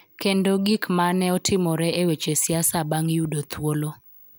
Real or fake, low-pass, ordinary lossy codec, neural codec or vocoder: real; none; none; none